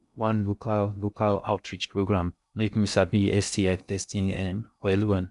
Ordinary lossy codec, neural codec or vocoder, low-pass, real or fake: none; codec, 16 kHz in and 24 kHz out, 0.6 kbps, FocalCodec, streaming, 2048 codes; 10.8 kHz; fake